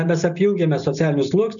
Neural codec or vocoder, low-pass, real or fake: none; 7.2 kHz; real